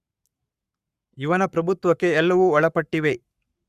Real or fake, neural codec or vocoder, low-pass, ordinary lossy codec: fake; codec, 44.1 kHz, 7.8 kbps, Pupu-Codec; 14.4 kHz; Opus, 64 kbps